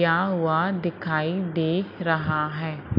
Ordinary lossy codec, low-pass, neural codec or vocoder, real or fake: none; 5.4 kHz; none; real